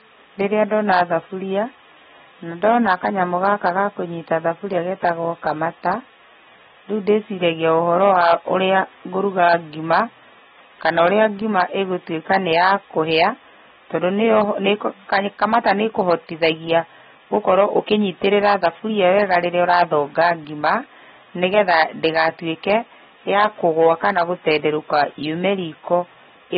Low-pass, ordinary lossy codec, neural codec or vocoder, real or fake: 19.8 kHz; AAC, 16 kbps; none; real